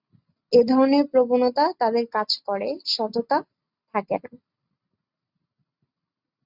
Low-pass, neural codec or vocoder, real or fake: 5.4 kHz; none; real